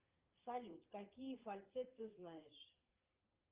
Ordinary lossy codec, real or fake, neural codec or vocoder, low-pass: Opus, 24 kbps; fake; vocoder, 44.1 kHz, 80 mel bands, Vocos; 3.6 kHz